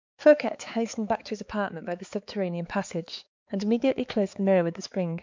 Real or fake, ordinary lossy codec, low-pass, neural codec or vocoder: fake; MP3, 64 kbps; 7.2 kHz; codec, 16 kHz, 4 kbps, X-Codec, HuBERT features, trained on balanced general audio